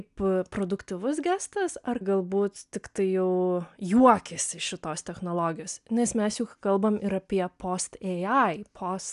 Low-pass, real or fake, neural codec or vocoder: 10.8 kHz; real; none